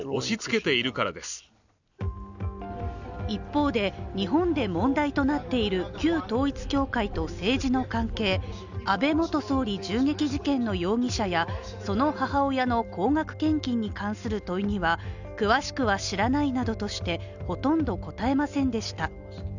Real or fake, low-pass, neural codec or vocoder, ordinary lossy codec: real; 7.2 kHz; none; none